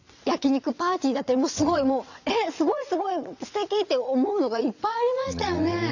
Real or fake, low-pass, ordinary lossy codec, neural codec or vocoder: fake; 7.2 kHz; none; vocoder, 22.05 kHz, 80 mel bands, WaveNeXt